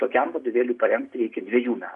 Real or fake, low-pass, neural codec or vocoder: real; 10.8 kHz; none